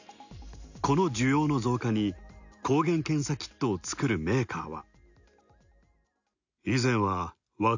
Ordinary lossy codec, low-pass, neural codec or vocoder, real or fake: none; 7.2 kHz; none; real